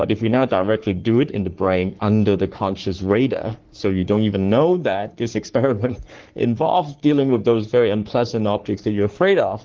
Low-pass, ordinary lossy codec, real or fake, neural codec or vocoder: 7.2 kHz; Opus, 16 kbps; fake; codec, 44.1 kHz, 3.4 kbps, Pupu-Codec